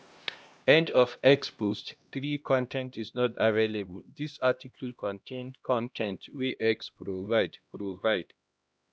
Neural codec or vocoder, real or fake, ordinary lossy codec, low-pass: codec, 16 kHz, 1 kbps, X-Codec, HuBERT features, trained on LibriSpeech; fake; none; none